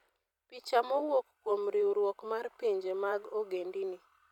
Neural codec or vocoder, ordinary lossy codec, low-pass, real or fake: vocoder, 44.1 kHz, 128 mel bands every 512 samples, BigVGAN v2; none; 19.8 kHz; fake